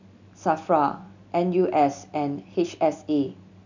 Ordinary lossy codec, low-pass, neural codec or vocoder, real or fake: none; 7.2 kHz; none; real